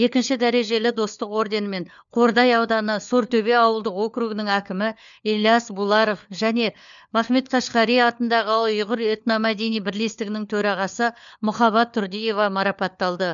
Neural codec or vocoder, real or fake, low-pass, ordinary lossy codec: codec, 16 kHz, 4 kbps, FunCodec, trained on LibriTTS, 50 frames a second; fake; 7.2 kHz; none